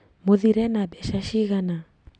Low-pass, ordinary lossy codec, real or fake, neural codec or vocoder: 9.9 kHz; none; real; none